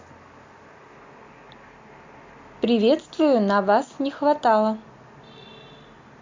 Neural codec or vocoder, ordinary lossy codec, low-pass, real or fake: none; MP3, 64 kbps; 7.2 kHz; real